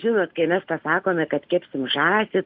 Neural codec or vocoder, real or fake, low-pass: none; real; 5.4 kHz